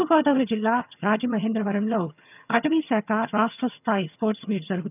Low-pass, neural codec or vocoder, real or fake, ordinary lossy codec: 3.6 kHz; vocoder, 22.05 kHz, 80 mel bands, HiFi-GAN; fake; none